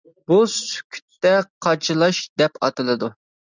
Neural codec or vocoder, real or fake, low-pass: none; real; 7.2 kHz